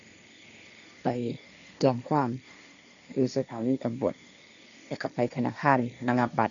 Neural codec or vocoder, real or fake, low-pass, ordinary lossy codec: codec, 16 kHz, 1.1 kbps, Voila-Tokenizer; fake; 7.2 kHz; none